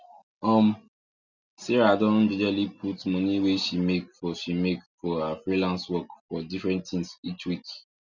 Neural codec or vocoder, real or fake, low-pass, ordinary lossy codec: none; real; 7.2 kHz; none